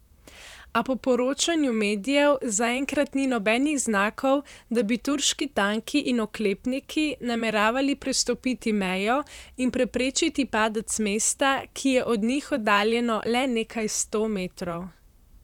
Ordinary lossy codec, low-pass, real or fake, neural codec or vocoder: none; 19.8 kHz; fake; vocoder, 44.1 kHz, 128 mel bands, Pupu-Vocoder